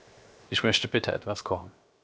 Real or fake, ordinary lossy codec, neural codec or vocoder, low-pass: fake; none; codec, 16 kHz, 0.7 kbps, FocalCodec; none